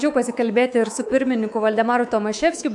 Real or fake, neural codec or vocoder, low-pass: fake; codec, 24 kHz, 3.1 kbps, DualCodec; 10.8 kHz